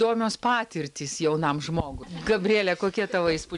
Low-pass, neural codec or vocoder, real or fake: 10.8 kHz; none; real